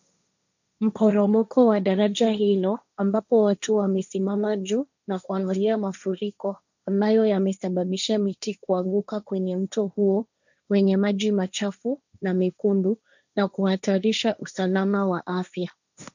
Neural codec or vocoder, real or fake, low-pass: codec, 16 kHz, 1.1 kbps, Voila-Tokenizer; fake; 7.2 kHz